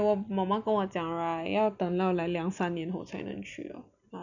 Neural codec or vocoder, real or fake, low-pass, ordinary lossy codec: none; real; 7.2 kHz; none